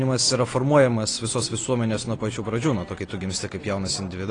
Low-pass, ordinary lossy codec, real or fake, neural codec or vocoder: 9.9 kHz; AAC, 32 kbps; real; none